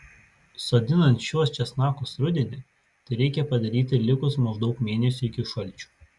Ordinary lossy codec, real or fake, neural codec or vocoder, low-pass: Opus, 64 kbps; real; none; 10.8 kHz